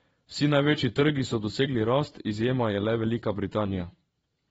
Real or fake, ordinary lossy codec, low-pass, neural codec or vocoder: fake; AAC, 24 kbps; 9.9 kHz; vocoder, 22.05 kHz, 80 mel bands, Vocos